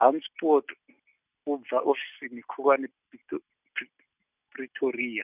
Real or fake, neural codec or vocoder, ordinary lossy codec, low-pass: real; none; none; 3.6 kHz